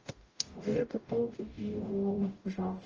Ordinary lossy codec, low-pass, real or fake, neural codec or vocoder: Opus, 24 kbps; 7.2 kHz; fake; codec, 44.1 kHz, 0.9 kbps, DAC